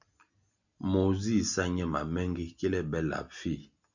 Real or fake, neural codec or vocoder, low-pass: real; none; 7.2 kHz